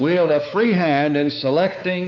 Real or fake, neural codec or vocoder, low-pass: fake; codec, 16 kHz, 4 kbps, X-Codec, WavLM features, trained on Multilingual LibriSpeech; 7.2 kHz